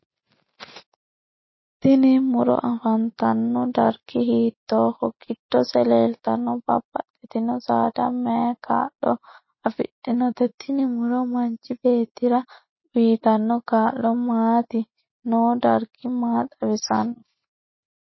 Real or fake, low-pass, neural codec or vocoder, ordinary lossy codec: real; 7.2 kHz; none; MP3, 24 kbps